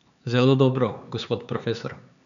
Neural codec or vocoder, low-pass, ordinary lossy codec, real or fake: codec, 16 kHz, 4 kbps, X-Codec, HuBERT features, trained on LibriSpeech; 7.2 kHz; none; fake